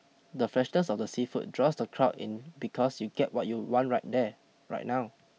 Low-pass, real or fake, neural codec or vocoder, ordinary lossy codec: none; real; none; none